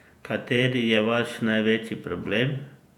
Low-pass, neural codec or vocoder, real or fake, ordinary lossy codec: 19.8 kHz; vocoder, 48 kHz, 128 mel bands, Vocos; fake; none